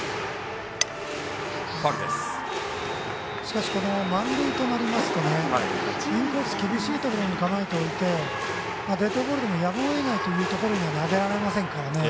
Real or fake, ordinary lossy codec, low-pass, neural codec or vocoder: real; none; none; none